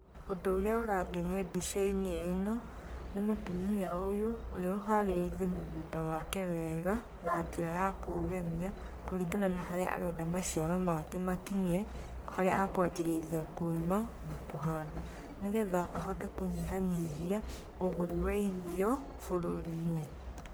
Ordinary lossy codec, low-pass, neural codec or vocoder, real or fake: none; none; codec, 44.1 kHz, 1.7 kbps, Pupu-Codec; fake